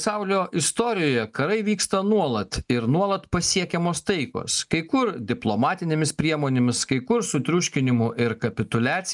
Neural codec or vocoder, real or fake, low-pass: none; real; 10.8 kHz